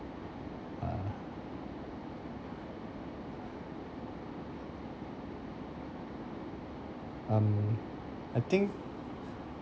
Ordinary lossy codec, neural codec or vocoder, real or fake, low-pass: none; none; real; none